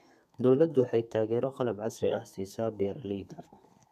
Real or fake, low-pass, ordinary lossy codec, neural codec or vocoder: fake; 14.4 kHz; none; codec, 32 kHz, 1.9 kbps, SNAC